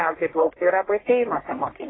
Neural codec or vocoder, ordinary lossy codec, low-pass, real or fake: codec, 44.1 kHz, 1.7 kbps, Pupu-Codec; AAC, 16 kbps; 7.2 kHz; fake